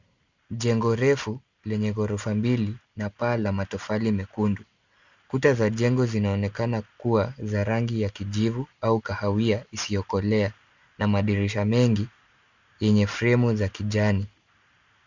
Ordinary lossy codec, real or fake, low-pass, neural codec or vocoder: Opus, 64 kbps; real; 7.2 kHz; none